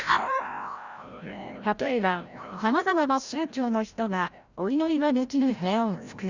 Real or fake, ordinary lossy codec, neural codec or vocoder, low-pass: fake; Opus, 64 kbps; codec, 16 kHz, 0.5 kbps, FreqCodec, larger model; 7.2 kHz